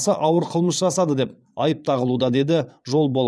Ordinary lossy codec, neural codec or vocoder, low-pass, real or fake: none; vocoder, 22.05 kHz, 80 mel bands, Vocos; none; fake